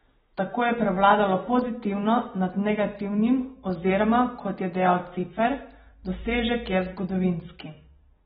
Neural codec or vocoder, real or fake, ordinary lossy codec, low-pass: none; real; AAC, 16 kbps; 19.8 kHz